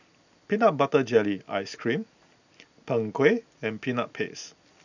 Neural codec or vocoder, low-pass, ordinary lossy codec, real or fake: none; 7.2 kHz; none; real